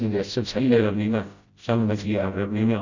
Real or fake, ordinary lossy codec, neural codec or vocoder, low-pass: fake; Opus, 64 kbps; codec, 16 kHz, 0.5 kbps, FreqCodec, smaller model; 7.2 kHz